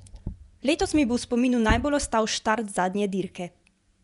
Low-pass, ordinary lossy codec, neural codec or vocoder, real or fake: 10.8 kHz; none; vocoder, 24 kHz, 100 mel bands, Vocos; fake